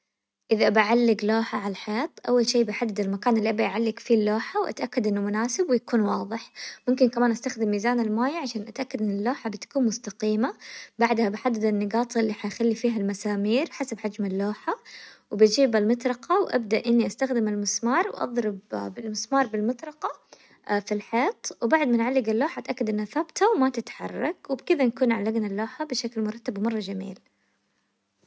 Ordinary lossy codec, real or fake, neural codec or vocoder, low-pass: none; real; none; none